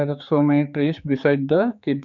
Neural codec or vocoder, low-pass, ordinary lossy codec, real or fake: codec, 16 kHz, 4 kbps, X-Codec, HuBERT features, trained on general audio; 7.2 kHz; none; fake